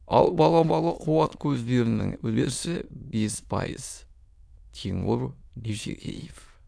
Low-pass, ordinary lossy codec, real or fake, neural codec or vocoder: none; none; fake; autoencoder, 22.05 kHz, a latent of 192 numbers a frame, VITS, trained on many speakers